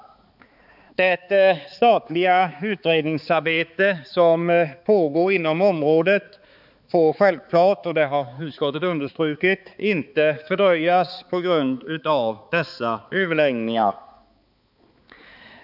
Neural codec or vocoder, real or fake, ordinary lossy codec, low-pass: codec, 16 kHz, 4 kbps, X-Codec, HuBERT features, trained on balanced general audio; fake; none; 5.4 kHz